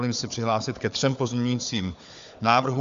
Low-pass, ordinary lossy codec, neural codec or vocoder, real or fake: 7.2 kHz; MP3, 64 kbps; codec, 16 kHz, 4 kbps, FunCodec, trained on Chinese and English, 50 frames a second; fake